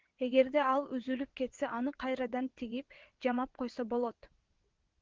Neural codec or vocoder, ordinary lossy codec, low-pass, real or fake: vocoder, 22.05 kHz, 80 mel bands, WaveNeXt; Opus, 16 kbps; 7.2 kHz; fake